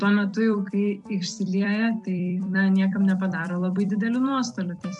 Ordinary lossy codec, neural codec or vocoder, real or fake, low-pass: MP3, 64 kbps; vocoder, 44.1 kHz, 128 mel bands every 256 samples, BigVGAN v2; fake; 10.8 kHz